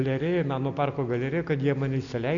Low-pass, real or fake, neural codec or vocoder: 7.2 kHz; real; none